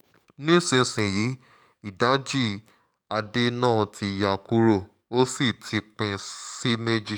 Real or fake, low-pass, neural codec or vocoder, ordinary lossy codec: fake; 19.8 kHz; codec, 44.1 kHz, 7.8 kbps, DAC; none